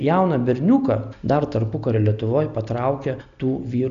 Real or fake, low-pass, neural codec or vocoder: real; 7.2 kHz; none